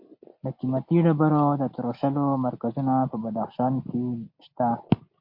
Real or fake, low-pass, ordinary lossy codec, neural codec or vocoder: real; 5.4 kHz; MP3, 32 kbps; none